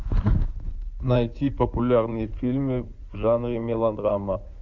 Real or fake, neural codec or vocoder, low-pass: fake; codec, 16 kHz in and 24 kHz out, 2.2 kbps, FireRedTTS-2 codec; 7.2 kHz